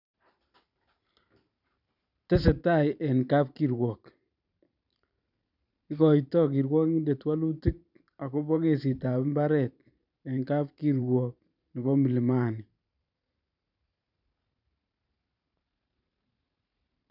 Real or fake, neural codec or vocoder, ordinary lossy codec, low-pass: fake; vocoder, 44.1 kHz, 128 mel bands every 512 samples, BigVGAN v2; none; 5.4 kHz